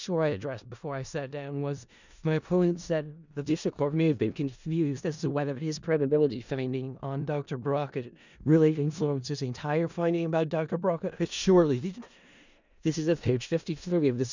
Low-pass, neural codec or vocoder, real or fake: 7.2 kHz; codec, 16 kHz in and 24 kHz out, 0.4 kbps, LongCat-Audio-Codec, four codebook decoder; fake